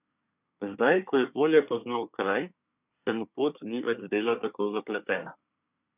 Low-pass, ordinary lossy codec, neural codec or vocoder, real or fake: 3.6 kHz; none; codec, 24 kHz, 1 kbps, SNAC; fake